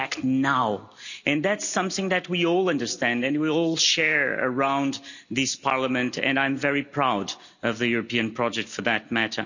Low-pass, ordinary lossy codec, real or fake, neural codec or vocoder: 7.2 kHz; none; real; none